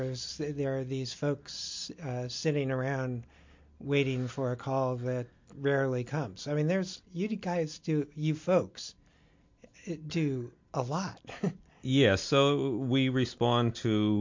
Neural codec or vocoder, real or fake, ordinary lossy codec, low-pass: none; real; MP3, 48 kbps; 7.2 kHz